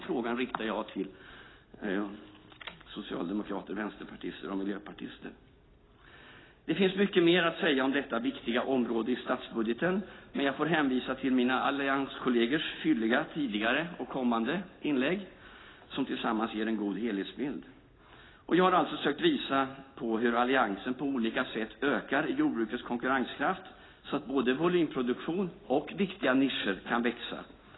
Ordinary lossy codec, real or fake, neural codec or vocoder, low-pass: AAC, 16 kbps; real; none; 7.2 kHz